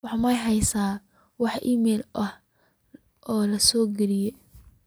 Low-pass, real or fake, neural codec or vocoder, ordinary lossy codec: none; real; none; none